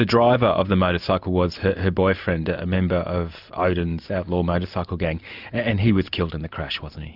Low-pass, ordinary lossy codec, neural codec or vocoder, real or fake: 5.4 kHz; Opus, 64 kbps; none; real